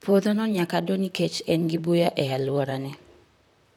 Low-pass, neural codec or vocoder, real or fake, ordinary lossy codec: 19.8 kHz; vocoder, 44.1 kHz, 128 mel bands, Pupu-Vocoder; fake; none